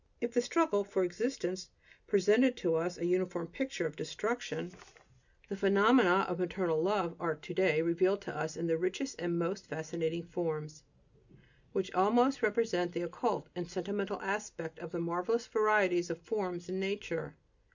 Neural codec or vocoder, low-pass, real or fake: none; 7.2 kHz; real